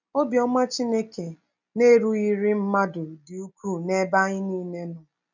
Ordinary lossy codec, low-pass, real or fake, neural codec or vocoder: none; 7.2 kHz; real; none